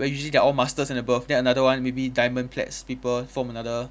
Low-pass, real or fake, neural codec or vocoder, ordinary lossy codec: none; real; none; none